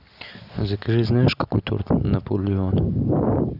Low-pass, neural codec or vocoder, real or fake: 5.4 kHz; none; real